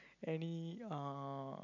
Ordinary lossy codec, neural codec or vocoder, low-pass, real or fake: none; none; 7.2 kHz; real